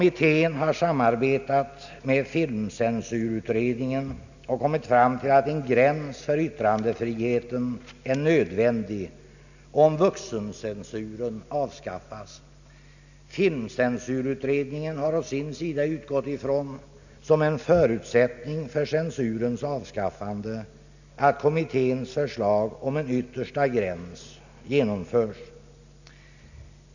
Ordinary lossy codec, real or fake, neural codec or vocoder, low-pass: none; real; none; 7.2 kHz